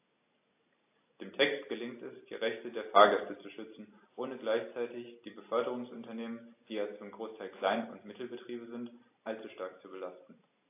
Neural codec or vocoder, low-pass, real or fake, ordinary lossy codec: none; 3.6 kHz; real; AAC, 24 kbps